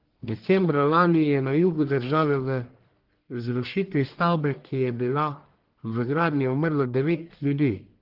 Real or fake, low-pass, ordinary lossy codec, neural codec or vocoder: fake; 5.4 kHz; Opus, 16 kbps; codec, 44.1 kHz, 1.7 kbps, Pupu-Codec